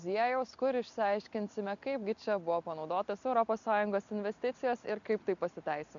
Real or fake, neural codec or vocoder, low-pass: real; none; 7.2 kHz